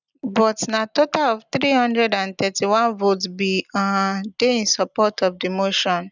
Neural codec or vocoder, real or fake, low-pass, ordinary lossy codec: none; real; 7.2 kHz; none